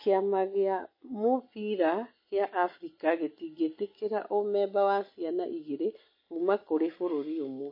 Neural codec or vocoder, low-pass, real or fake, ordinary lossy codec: none; 5.4 kHz; real; MP3, 24 kbps